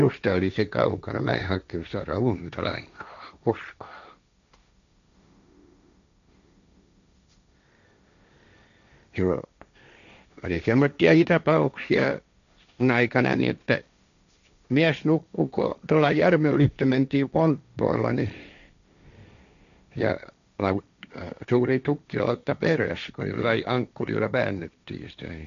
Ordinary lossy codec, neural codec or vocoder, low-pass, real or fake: MP3, 96 kbps; codec, 16 kHz, 1.1 kbps, Voila-Tokenizer; 7.2 kHz; fake